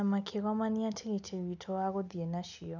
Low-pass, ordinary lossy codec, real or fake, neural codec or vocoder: 7.2 kHz; none; real; none